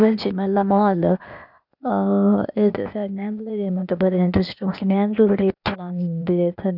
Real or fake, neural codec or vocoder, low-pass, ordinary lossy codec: fake; codec, 16 kHz, 0.8 kbps, ZipCodec; 5.4 kHz; none